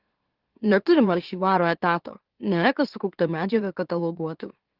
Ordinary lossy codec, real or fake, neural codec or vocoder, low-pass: Opus, 16 kbps; fake; autoencoder, 44.1 kHz, a latent of 192 numbers a frame, MeloTTS; 5.4 kHz